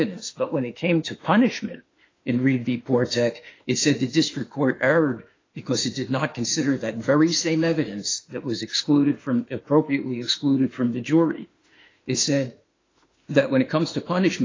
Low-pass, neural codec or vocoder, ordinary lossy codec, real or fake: 7.2 kHz; autoencoder, 48 kHz, 32 numbers a frame, DAC-VAE, trained on Japanese speech; AAC, 32 kbps; fake